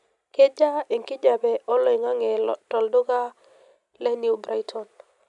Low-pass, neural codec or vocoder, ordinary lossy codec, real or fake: 10.8 kHz; none; none; real